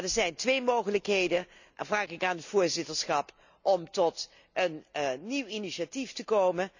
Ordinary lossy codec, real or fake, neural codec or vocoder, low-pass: none; real; none; 7.2 kHz